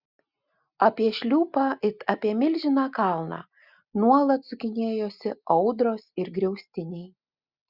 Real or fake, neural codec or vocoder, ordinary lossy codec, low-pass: real; none; Opus, 64 kbps; 5.4 kHz